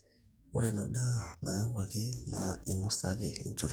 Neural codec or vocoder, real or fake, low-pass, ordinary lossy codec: codec, 44.1 kHz, 2.6 kbps, DAC; fake; none; none